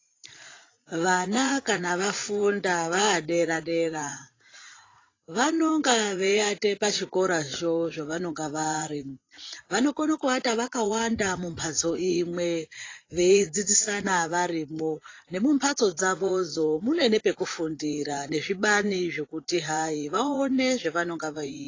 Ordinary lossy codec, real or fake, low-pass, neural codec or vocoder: AAC, 32 kbps; fake; 7.2 kHz; vocoder, 44.1 kHz, 80 mel bands, Vocos